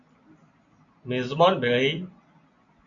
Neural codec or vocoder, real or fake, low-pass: none; real; 7.2 kHz